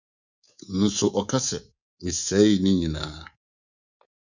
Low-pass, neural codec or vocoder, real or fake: 7.2 kHz; codec, 24 kHz, 3.1 kbps, DualCodec; fake